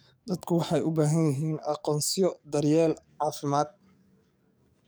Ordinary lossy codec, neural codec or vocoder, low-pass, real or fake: none; codec, 44.1 kHz, 7.8 kbps, DAC; none; fake